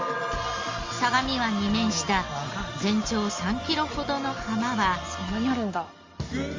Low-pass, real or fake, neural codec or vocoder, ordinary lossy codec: 7.2 kHz; real; none; Opus, 32 kbps